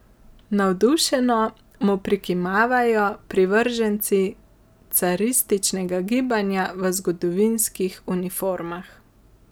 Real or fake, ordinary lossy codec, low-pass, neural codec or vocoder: real; none; none; none